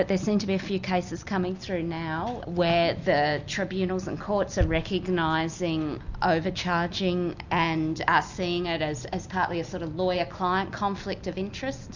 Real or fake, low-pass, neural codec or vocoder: real; 7.2 kHz; none